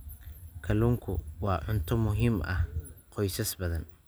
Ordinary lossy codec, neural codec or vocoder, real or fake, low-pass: none; none; real; none